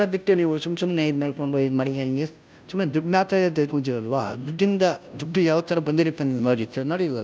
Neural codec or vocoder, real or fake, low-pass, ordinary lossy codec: codec, 16 kHz, 0.5 kbps, FunCodec, trained on Chinese and English, 25 frames a second; fake; none; none